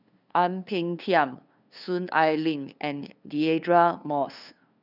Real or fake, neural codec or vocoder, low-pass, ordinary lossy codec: fake; codec, 16 kHz, 2 kbps, FunCodec, trained on LibriTTS, 25 frames a second; 5.4 kHz; none